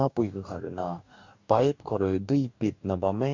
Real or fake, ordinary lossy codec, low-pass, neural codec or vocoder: fake; MP3, 64 kbps; 7.2 kHz; codec, 44.1 kHz, 2.6 kbps, DAC